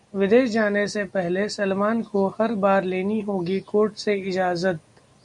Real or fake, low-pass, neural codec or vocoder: real; 10.8 kHz; none